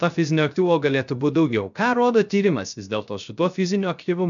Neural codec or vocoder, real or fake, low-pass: codec, 16 kHz, 0.3 kbps, FocalCodec; fake; 7.2 kHz